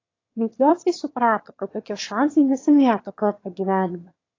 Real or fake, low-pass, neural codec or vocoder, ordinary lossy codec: fake; 7.2 kHz; autoencoder, 22.05 kHz, a latent of 192 numbers a frame, VITS, trained on one speaker; AAC, 32 kbps